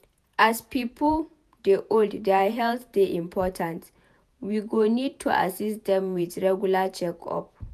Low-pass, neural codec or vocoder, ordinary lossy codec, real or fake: 14.4 kHz; vocoder, 48 kHz, 128 mel bands, Vocos; none; fake